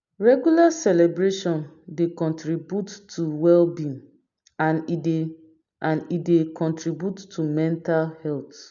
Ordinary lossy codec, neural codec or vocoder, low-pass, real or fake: none; none; 7.2 kHz; real